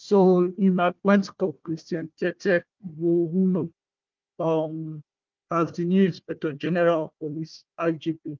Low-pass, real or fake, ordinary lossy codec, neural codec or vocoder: 7.2 kHz; fake; Opus, 32 kbps; codec, 16 kHz, 1 kbps, FunCodec, trained on Chinese and English, 50 frames a second